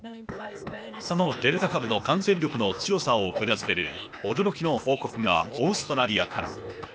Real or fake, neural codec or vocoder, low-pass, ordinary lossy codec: fake; codec, 16 kHz, 0.8 kbps, ZipCodec; none; none